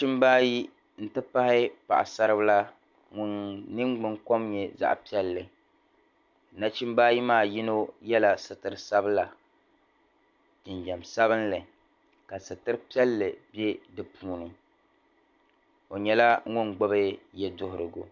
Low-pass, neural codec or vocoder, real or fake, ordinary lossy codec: 7.2 kHz; none; real; MP3, 64 kbps